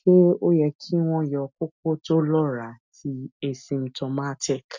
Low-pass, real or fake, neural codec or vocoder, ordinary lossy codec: 7.2 kHz; real; none; MP3, 64 kbps